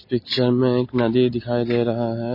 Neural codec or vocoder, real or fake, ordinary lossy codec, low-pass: none; real; MP3, 24 kbps; 5.4 kHz